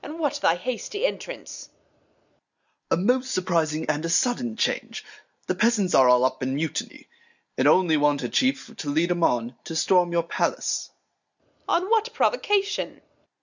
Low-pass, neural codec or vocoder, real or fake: 7.2 kHz; none; real